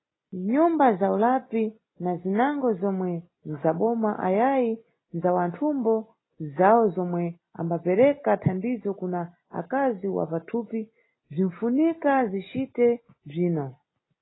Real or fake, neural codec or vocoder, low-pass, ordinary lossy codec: real; none; 7.2 kHz; AAC, 16 kbps